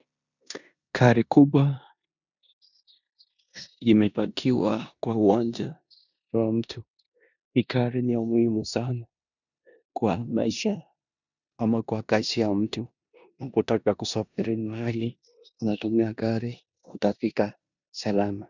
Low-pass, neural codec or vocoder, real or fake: 7.2 kHz; codec, 16 kHz in and 24 kHz out, 0.9 kbps, LongCat-Audio-Codec, four codebook decoder; fake